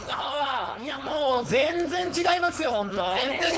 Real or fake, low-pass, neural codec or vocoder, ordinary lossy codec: fake; none; codec, 16 kHz, 4.8 kbps, FACodec; none